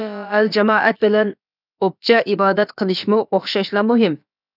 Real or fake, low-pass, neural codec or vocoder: fake; 5.4 kHz; codec, 16 kHz, about 1 kbps, DyCAST, with the encoder's durations